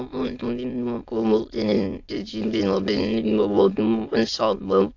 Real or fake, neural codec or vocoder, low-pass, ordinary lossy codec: fake; autoencoder, 22.05 kHz, a latent of 192 numbers a frame, VITS, trained on many speakers; 7.2 kHz; AAC, 48 kbps